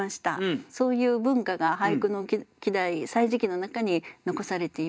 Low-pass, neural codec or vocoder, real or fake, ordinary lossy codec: none; none; real; none